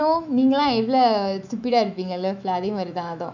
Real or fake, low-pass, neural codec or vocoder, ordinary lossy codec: fake; 7.2 kHz; autoencoder, 48 kHz, 128 numbers a frame, DAC-VAE, trained on Japanese speech; none